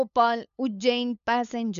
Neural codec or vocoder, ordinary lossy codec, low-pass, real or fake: codec, 16 kHz, 8 kbps, FunCodec, trained on LibriTTS, 25 frames a second; none; 7.2 kHz; fake